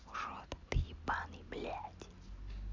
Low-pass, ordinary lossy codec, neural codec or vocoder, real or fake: 7.2 kHz; MP3, 64 kbps; none; real